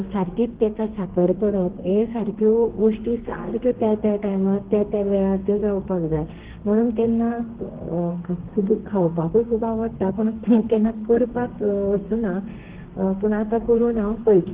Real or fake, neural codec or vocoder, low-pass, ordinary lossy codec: fake; codec, 32 kHz, 1.9 kbps, SNAC; 3.6 kHz; Opus, 16 kbps